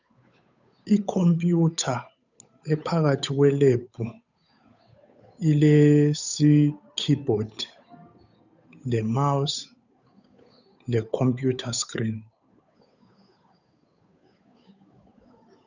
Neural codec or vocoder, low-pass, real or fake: codec, 16 kHz, 8 kbps, FunCodec, trained on Chinese and English, 25 frames a second; 7.2 kHz; fake